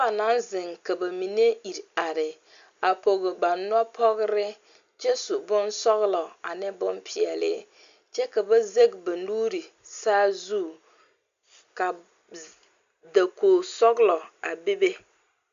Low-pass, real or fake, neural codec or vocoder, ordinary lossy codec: 7.2 kHz; real; none; Opus, 64 kbps